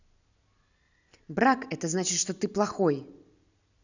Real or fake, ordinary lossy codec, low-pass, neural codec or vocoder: real; none; 7.2 kHz; none